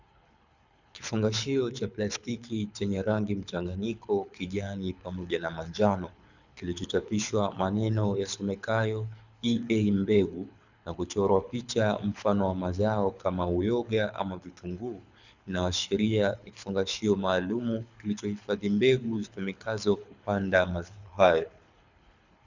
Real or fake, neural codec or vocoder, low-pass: fake; codec, 24 kHz, 6 kbps, HILCodec; 7.2 kHz